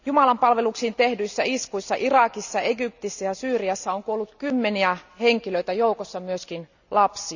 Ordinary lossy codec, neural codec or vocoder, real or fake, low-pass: none; none; real; 7.2 kHz